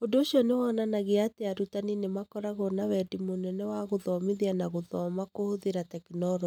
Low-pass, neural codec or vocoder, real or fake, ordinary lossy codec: 19.8 kHz; none; real; none